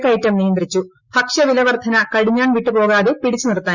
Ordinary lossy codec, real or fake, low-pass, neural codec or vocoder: Opus, 64 kbps; real; 7.2 kHz; none